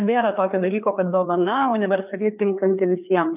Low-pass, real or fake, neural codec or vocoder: 3.6 kHz; fake; codec, 16 kHz, 2 kbps, FreqCodec, larger model